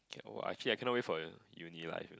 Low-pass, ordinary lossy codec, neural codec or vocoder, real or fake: none; none; none; real